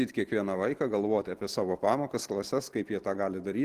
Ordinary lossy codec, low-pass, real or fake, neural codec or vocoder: Opus, 16 kbps; 14.4 kHz; real; none